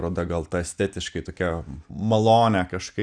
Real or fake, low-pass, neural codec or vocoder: real; 9.9 kHz; none